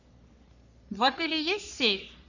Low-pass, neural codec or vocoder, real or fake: 7.2 kHz; codec, 44.1 kHz, 3.4 kbps, Pupu-Codec; fake